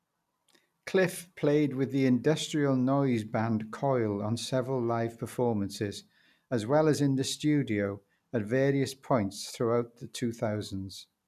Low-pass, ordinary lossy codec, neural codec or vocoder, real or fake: 14.4 kHz; none; none; real